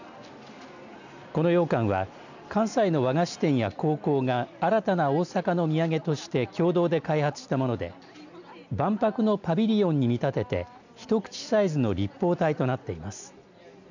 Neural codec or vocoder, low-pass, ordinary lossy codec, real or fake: none; 7.2 kHz; none; real